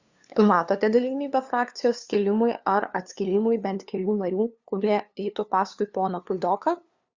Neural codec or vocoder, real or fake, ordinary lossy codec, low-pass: codec, 16 kHz, 2 kbps, FunCodec, trained on LibriTTS, 25 frames a second; fake; Opus, 64 kbps; 7.2 kHz